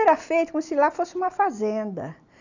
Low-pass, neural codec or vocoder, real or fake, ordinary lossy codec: 7.2 kHz; none; real; none